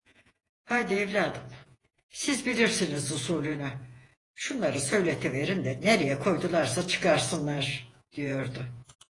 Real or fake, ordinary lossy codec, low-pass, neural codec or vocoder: fake; AAC, 32 kbps; 10.8 kHz; vocoder, 48 kHz, 128 mel bands, Vocos